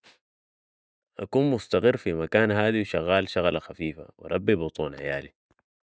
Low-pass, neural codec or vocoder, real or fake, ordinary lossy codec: none; none; real; none